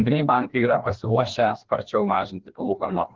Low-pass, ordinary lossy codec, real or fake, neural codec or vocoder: 7.2 kHz; Opus, 16 kbps; fake; codec, 16 kHz, 1 kbps, FreqCodec, larger model